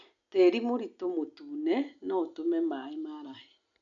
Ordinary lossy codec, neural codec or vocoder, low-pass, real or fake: none; none; 7.2 kHz; real